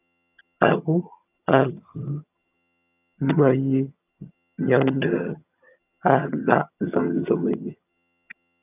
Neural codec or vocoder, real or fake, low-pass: vocoder, 22.05 kHz, 80 mel bands, HiFi-GAN; fake; 3.6 kHz